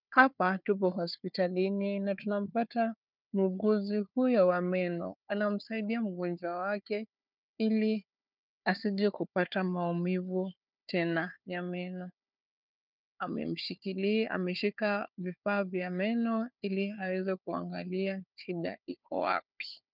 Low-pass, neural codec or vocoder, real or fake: 5.4 kHz; codec, 16 kHz, 4 kbps, FunCodec, trained on Chinese and English, 50 frames a second; fake